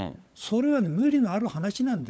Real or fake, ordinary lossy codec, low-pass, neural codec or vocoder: fake; none; none; codec, 16 kHz, 16 kbps, FunCodec, trained on LibriTTS, 50 frames a second